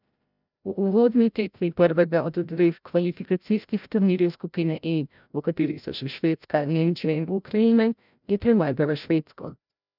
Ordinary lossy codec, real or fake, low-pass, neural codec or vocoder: none; fake; 5.4 kHz; codec, 16 kHz, 0.5 kbps, FreqCodec, larger model